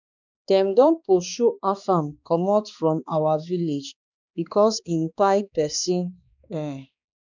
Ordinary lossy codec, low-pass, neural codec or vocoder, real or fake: none; 7.2 kHz; codec, 16 kHz, 2 kbps, X-Codec, HuBERT features, trained on balanced general audio; fake